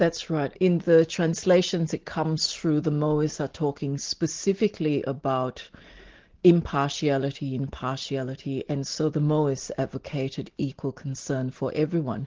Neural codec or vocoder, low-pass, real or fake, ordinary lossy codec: none; 7.2 kHz; real; Opus, 16 kbps